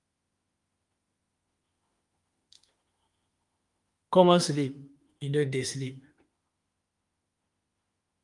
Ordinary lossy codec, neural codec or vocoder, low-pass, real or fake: Opus, 32 kbps; autoencoder, 48 kHz, 32 numbers a frame, DAC-VAE, trained on Japanese speech; 10.8 kHz; fake